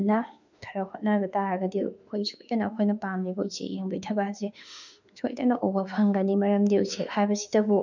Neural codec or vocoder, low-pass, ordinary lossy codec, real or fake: autoencoder, 48 kHz, 32 numbers a frame, DAC-VAE, trained on Japanese speech; 7.2 kHz; none; fake